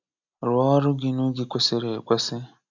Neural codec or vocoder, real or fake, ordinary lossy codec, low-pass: none; real; none; 7.2 kHz